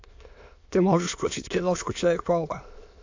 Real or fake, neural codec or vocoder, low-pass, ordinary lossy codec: fake; autoencoder, 22.05 kHz, a latent of 192 numbers a frame, VITS, trained on many speakers; 7.2 kHz; AAC, 48 kbps